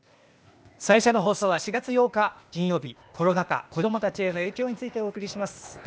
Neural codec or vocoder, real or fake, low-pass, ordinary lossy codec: codec, 16 kHz, 0.8 kbps, ZipCodec; fake; none; none